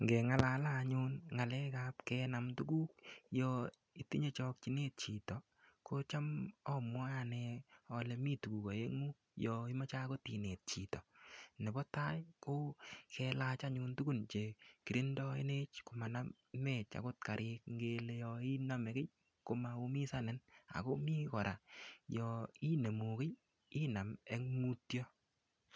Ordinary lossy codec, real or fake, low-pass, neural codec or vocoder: none; real; none; none